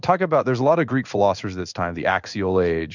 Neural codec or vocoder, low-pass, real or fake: codec, 16 kHz in and 24 kHz out, 1 kbps, XY-Tokenizer; 7.2 kHz; fake